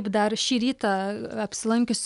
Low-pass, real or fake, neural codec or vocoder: 10.8 kHz; real; none